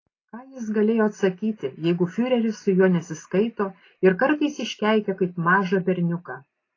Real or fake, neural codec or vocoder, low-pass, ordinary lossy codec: real; none; 7.2 kHz; AAC, 32 kbps